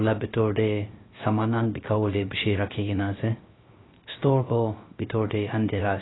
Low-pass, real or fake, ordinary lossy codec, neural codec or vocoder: 7.2 kHz; fake; AAC, 16 kbps; codec, 16 kHz, 0.3 kbps, FocalCodec